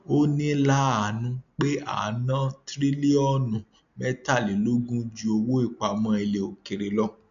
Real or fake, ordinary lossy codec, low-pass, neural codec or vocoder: real; none; 7.2 kHz; none